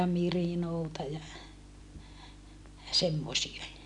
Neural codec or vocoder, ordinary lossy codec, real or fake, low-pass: none; none; real; 10.8 kHz